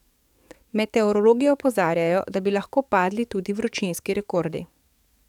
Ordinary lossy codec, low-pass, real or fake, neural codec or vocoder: none; 19.8 kHz; fake; codec, 44.1 kHz, 7.8 kbps, DAC